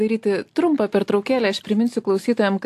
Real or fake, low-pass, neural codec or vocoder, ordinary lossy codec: real; 14.4 kHz; none; AAC, 64 kbps